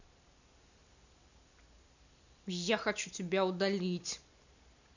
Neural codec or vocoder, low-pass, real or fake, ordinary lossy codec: none; 7.2 kHz; real; none